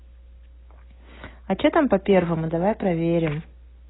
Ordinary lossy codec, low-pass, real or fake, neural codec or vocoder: AAC, 16 kbps; 7.2 kHz; real; none